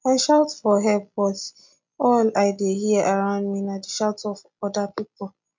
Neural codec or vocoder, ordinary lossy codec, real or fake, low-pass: none; MP3, 64 kbps; real; 7.2 kHz